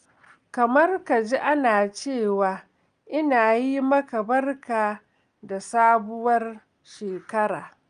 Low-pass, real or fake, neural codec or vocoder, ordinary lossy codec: 9.9 kHz; real; none; Opus, 32 kbps